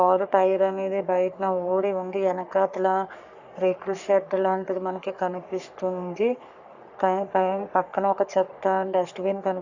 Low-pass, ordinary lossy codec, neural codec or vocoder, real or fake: 7.2 kHz; Opus, 64 kbps; codec, 44.1 kHz, 3.4 kbps, Pupu-Codec; fake